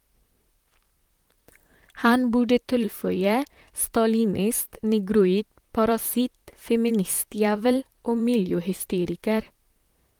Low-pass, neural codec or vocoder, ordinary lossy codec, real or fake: 19.8 kHz; vocoder, 44.1 kHz, 128 mel bands, Pupu-Vocoder; Opus, 32 kbps; fake